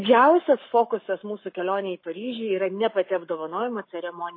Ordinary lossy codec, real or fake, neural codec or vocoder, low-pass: MP3, 24 kbps; fake; codec, 44.1 kHz, 7.8 kbps, Pupu-Codec; 5.4 kHz